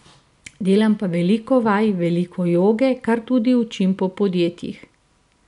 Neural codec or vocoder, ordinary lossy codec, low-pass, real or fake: none; none; 10.8 kHz; real